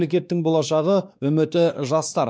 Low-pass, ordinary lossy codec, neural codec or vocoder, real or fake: none; none; codec, 16 kHz, 2 kbps, X-Codec, WavLM features, trained on Multilingual LibriSpeech; fake